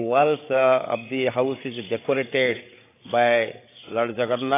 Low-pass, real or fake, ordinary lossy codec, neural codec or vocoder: 3.6 kHz; fake; AAC, 24 kbps; codec, 16 kHz, 8 kbps, FreqCodec, larger model